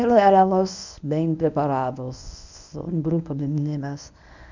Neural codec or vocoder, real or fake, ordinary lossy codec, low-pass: codec, 24 kHz, 0.9 kbps, WavTokenizer, medium speech release version 1; fake; none; 7.2 kHz